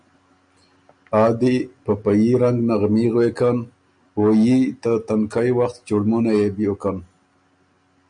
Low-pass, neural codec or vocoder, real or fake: 9.9 kHz; none; real